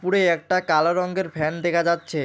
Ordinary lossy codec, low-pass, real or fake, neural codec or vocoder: none; none; real; none